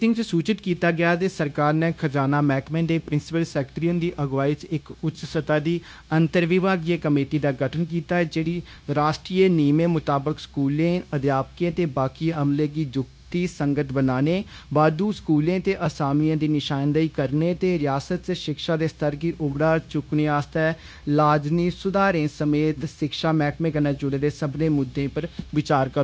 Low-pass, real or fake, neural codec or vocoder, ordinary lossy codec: none; fake; codec, 16 kHz, 0.9 kbps, LongCat-Audio-Codec; none